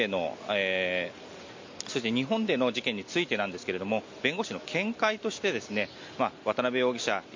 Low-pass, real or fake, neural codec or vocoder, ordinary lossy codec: 7.2 kHz; real; none; MP3, 48 kbps